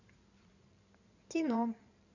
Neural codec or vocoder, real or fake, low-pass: codec, 16 kHz in and 24 kHz out, 2.2 kbps, FireRedTTS-2 codec; fake; 7.2 kHz